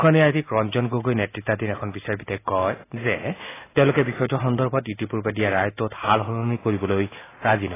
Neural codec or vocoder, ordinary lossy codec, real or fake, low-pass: none; AAC, 16 kbps; real; 3.6 kHz